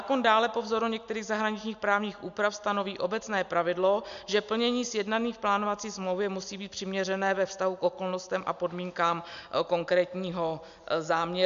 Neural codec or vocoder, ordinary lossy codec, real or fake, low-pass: none; MP3, 64 kbps; real; 7.2 kHz